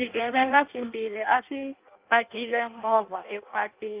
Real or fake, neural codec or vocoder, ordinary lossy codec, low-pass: fake; codec, 16 kHz in and 24 kHz out, 0.6 kbps, FireRedTTS-2 codec; Opus, 32 kbps; 3.6 kHz